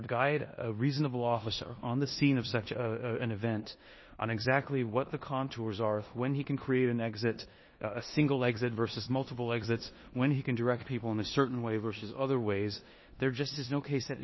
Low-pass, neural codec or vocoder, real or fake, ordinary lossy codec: 7.2 kHz; codec, 16 kHz in and 24 kHz out, 0.9 kbps, LongCat-Audio-Codec, four codebook decoder; fake; MP3, 24 kbps